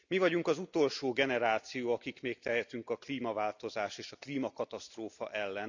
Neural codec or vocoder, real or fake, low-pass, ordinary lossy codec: none; real; 7.2 kHz; none